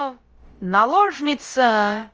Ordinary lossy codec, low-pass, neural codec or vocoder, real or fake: Opus, 24 kbps; 7.2 kHz; codec, 16 kHz, about 1 kbps, DyCAST, with the encoder's durations; fake